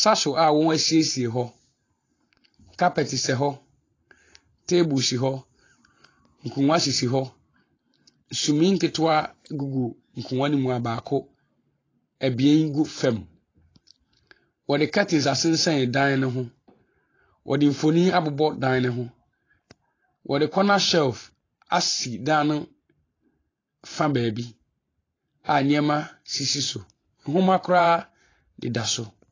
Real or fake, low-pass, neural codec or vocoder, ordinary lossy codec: fake; 7.2 kHz; vocoder, 44.1 kHz, 128 mel bands every 512 samples, BigVGAN v2; AAC, 32 kbps